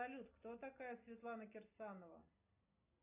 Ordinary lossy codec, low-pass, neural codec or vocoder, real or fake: AAC, 32 kbps; 3.6 kHz; none; real